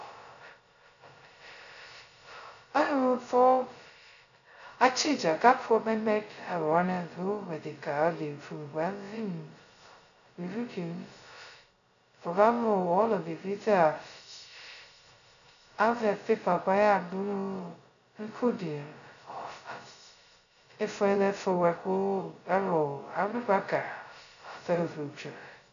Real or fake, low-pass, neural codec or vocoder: fake; 7.2 kHz; codec, 16 kHz, 0.2 kbps, FocalCodec